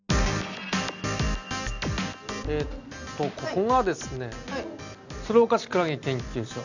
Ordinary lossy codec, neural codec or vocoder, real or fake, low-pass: none; none; real; 7.2 kHz